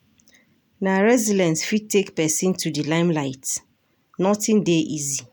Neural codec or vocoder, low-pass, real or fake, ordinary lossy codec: none; none; real; none